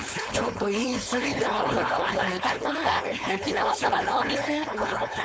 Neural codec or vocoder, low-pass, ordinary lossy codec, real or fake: codec, 16 kHz, 4.8 kbps, FACodec; none; none; fake